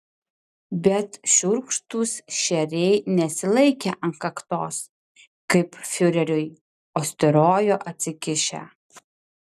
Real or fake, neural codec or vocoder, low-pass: real; none; 14.4 kHz